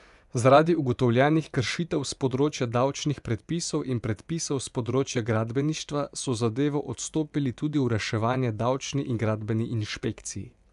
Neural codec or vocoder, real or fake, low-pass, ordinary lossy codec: vocoder, 24 kHz, 100 mel bands, Vocos; fake; 10.8 kHz; none